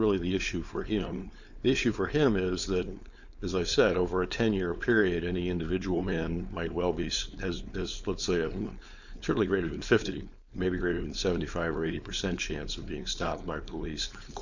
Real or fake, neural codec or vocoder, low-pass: fake; codec, 16 kHz, 4.8 kbps, FACodec; 7.2 kHz